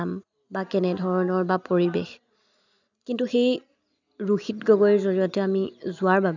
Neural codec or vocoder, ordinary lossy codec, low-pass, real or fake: none; none; 7.2 kHz; real